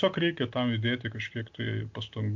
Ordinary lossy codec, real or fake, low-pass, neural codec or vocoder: MP3, 48 kbps; real; 7.2 kHz; none